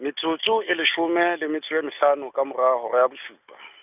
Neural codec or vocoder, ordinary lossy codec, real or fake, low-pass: none; none; real; 3.6 kHz